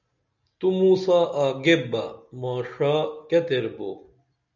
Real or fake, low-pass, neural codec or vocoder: real; 7.2 kHz; none